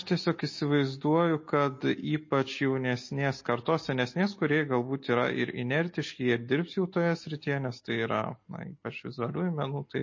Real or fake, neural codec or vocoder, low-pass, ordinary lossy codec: real; none; 7.2 kHz; MP3, 32 kbps